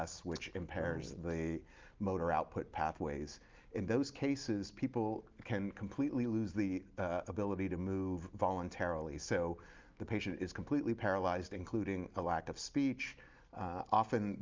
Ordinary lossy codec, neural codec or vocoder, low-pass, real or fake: Opus, 32 kbps; none; 7.2 kHz; real